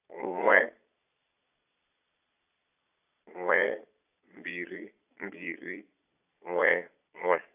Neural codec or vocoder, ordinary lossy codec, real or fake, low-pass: vocoder, 22.05 kHz, 80 mel bands, Vocos; AAC, 32 kbps; fake; 3.6 kHz